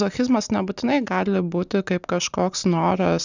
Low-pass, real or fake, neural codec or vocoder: 7.2 kHz; real; none